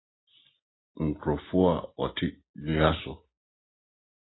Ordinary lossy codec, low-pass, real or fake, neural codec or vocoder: AAC, 16 kbps; 7.2 kHz; real; none